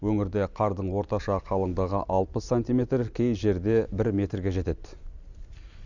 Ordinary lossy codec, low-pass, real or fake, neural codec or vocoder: none; 7.2 kHz; real; none